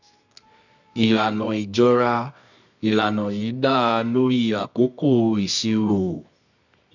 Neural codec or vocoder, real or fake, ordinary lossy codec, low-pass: codec, 24 kHz, 0.9 kbps, WavTokenizer, medium music audio release; fake; none; 7.2 kHz